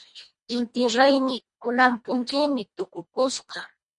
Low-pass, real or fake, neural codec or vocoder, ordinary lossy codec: 10.8 kHz; fake; codec, 24 kHz, 1.5 kbps, HILCodec; MP3, 48 kbps